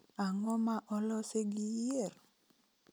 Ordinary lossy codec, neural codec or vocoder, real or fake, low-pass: none; none; real; none